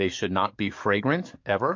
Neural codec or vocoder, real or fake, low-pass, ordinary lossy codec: codec, 44.1 kHz, 7.8 kbps, DAC; fake; 7.2 kHz; MP3, 48 kbps